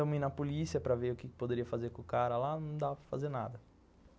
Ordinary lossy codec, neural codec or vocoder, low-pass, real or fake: none; none; none; real